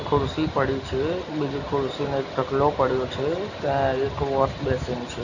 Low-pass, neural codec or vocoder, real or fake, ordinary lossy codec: 7.2 kHz; none; real; none